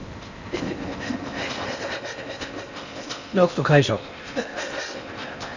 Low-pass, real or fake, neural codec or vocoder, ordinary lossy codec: 7.2 kHz; fake; codec, 16 kHz in and 24 kHz out, 0.8 kbps, FocalCodec, streaming, 65536 codes; none